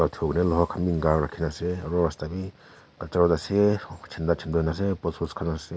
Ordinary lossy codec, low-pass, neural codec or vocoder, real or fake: none; none; none; real